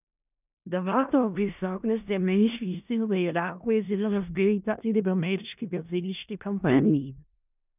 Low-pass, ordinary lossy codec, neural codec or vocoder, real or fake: 3.6 kHz; none; codec, 16 kHz in and 24 kHz out, 0.4 kbps, LongCat-Audio-Codec, four codebook decoder; fake